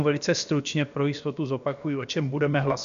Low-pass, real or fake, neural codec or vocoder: 7.2 kHz; fake; codec, 16 kHz, about 1 kbps, DyCAST, with the encoder's durations